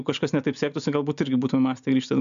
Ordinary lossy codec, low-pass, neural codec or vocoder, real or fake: MP3, 64 kbps; 7.2 kHz; none; real